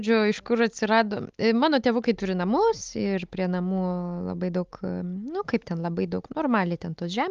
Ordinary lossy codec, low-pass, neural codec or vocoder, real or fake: Opus, 32 kbps; 7.2 kHz; none; real